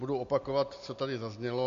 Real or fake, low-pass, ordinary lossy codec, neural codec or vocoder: real; 7.2 kHz; MP3, 48 kbps; none